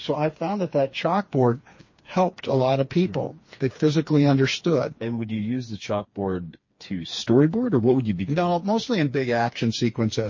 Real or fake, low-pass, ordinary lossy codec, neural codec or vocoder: fake; 7.2 kHz; MP3, 32 kbps; codec, 16 kHz, 4 kbps, FreqCodec, smaller model